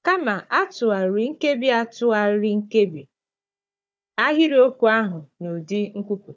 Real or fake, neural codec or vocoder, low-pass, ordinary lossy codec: fake; codec, 16 kHz, 4 kbps, FunCodec, trained on Chinese and English, 50 frames a second; none; none